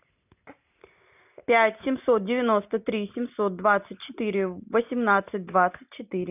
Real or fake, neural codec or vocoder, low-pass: real; none; 3.6 kHz